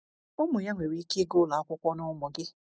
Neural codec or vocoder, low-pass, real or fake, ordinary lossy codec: none; none; real; none